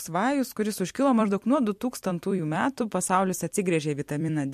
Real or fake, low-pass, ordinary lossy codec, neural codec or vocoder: fake; 14.4 kHz; MP3, 64 kbps; vocoder, 44.1 kHz, 128 mel bands every 256 samples, BigVGAN v2